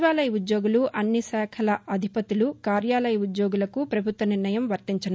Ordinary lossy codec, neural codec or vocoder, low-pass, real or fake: none; none; none; real